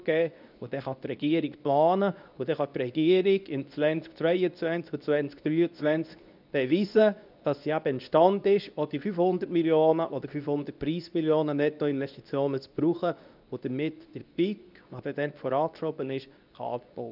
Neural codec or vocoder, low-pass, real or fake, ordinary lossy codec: codec, 24 kHz, 0.9 kbps, WavTokenizer, medium speech release version 2; 5.4 kHz; fake; none